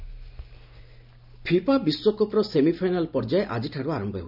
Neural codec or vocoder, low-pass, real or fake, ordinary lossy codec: none; 5.4 kHz; real; none